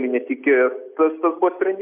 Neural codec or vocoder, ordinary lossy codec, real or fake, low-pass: none; AAC, 32 kbps; real; 3.6 kHz